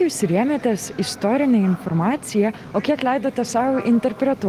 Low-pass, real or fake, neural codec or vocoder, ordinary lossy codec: 14.4 kHz; real; none; Opus, 24 kbps